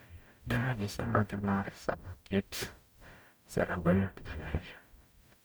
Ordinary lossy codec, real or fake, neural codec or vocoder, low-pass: none; fake; codec, 44.1 kHz, 0.9 kbps, DAC; none